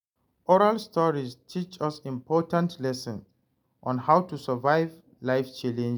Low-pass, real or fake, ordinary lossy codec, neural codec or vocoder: none; real; none; none